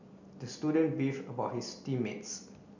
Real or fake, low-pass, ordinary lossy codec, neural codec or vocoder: real; 7.2 kHz; none; none